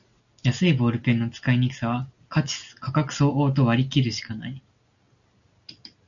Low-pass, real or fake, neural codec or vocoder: 7.2 kHz; real; none